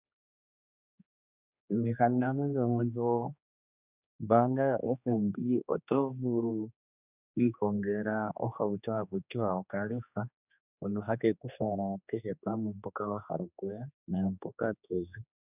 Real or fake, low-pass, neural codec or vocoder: fake; 3.6 kHz; codec, 16 kHz, 2 kbps, X-Codec, HuBERT features, trained on general audio